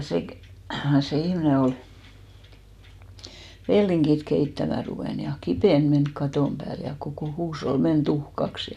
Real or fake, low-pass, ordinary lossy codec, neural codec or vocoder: real; 14.4 kHz; none; none